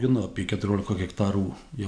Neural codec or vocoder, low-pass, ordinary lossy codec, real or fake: none; 9.9 kHz; MP3, 96 kbps; real